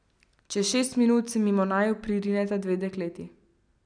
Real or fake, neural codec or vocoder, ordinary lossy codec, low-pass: real; none; none; 9.9 kHz